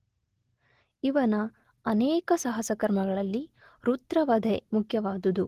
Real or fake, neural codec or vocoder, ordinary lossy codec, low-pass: real; none; Opus, 16 kbps; 14.4 kHz